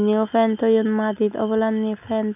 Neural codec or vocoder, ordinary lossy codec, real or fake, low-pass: none; none; real; 3.6 kHz